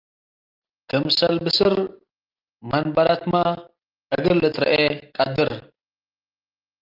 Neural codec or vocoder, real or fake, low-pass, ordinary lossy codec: none; real; 5.4 kHz; Opus, 24 kbps